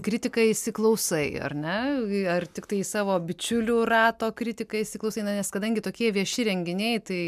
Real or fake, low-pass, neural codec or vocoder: real; 14.4 kHz; none